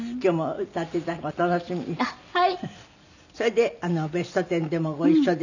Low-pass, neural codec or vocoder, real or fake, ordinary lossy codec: 7.2 kHz; none; real; none